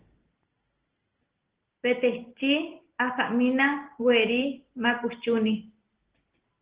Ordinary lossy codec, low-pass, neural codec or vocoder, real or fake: Opus, 16 kbps; 3.6 kHz; none; real